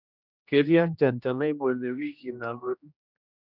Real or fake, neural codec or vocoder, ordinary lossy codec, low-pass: fake; codec, 16 kHz, 1 kbps, X-Codec, HuBERT features, trained on general audio; none; 5.4 kHz